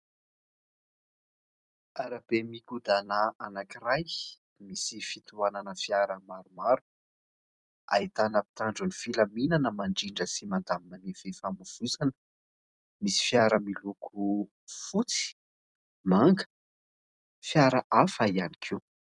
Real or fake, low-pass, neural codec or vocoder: fake; 10.8 kHz; vocoder, 44.1 kHz, 128 mel bands every 256 samples, BigVGAN v2